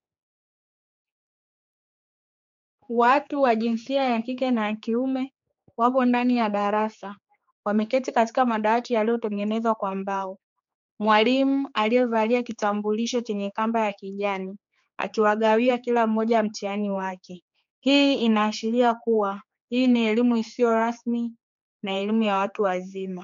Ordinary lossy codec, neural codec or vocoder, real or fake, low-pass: MP3, 64 kbps; codec, 16 kHz, 4 kbps, X-Codec, HuBERT features, trained on general audio; fake; 7.2 kHz